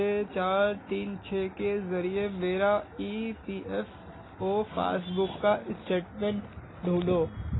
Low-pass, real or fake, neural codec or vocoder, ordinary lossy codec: 7.2 kHz; real; none; AAC, 16 kbps